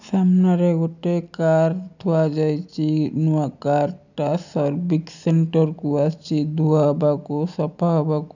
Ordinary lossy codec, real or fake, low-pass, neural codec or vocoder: none; real; 7.2 kHz; none